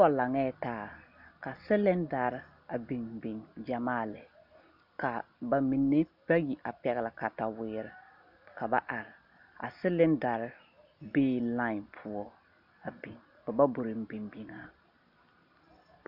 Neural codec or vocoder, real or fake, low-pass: none; real; 5.4 kHz